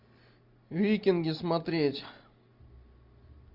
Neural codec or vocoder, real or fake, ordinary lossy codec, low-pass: none; real; Opus, 64 kbps; 5.4 kHz